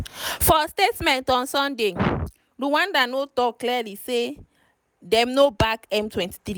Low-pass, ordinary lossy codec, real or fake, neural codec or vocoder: none; none; real; none